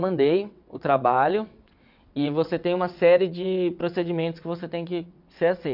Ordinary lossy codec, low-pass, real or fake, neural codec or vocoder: none; 5.4 kHz; fake; vocoder, 22.05 kHz, 80 mel bands, WaveNeXt